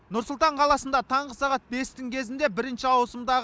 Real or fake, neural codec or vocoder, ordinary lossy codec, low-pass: real; none; none; none